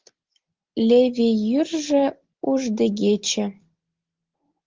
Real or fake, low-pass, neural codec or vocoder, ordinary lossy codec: real; 7.2 kHz; none; Opus, 16 kbps